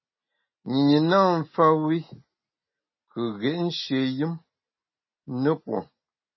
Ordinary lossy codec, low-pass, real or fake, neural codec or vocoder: MP3, 24 kbps; 7.2 kHz; real; none